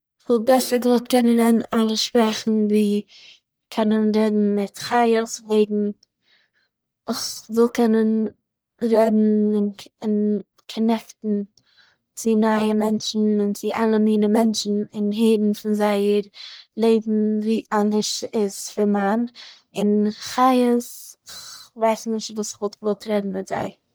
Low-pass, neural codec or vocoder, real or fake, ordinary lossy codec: none; codec, 44.1 kHz, 1.7 kbps, Pupu-Codec; fake; none